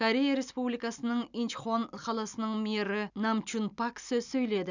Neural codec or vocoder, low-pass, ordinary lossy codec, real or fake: none; 7.2 kHz; none; real